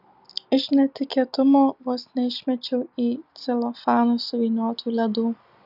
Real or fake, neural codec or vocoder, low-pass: real; none; 5.4 kHz